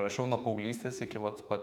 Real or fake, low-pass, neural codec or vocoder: fake; 19.8 kHz; autoencoder, 48 kHz, 32 numbers a frame, DAC-VAE, trained on Japanese speech